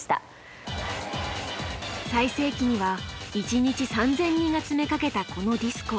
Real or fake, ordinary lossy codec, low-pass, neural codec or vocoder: real; none; none; none